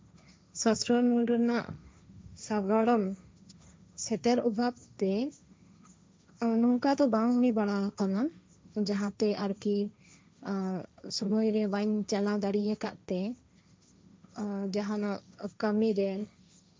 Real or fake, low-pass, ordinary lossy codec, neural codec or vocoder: fake; none; none; codec, 16 kHz, 1.1 kbps, Voila-Tokenizer